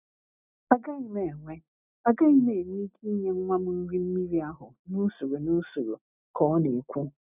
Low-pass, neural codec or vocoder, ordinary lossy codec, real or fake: 3.6 kHz; none; none; real